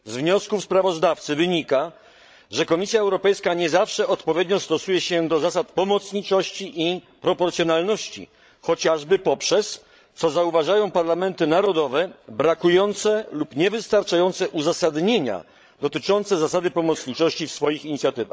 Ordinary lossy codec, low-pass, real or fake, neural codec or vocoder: none; none; fake; codec, 16 kHz, 16 kbps, FreqCodec, larger model